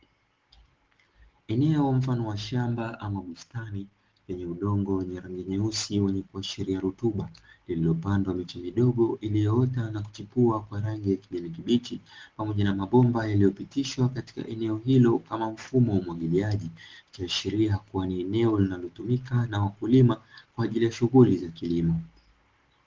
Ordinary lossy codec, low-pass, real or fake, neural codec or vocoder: Opus, 16 kbps; 7.2 kHz; real; none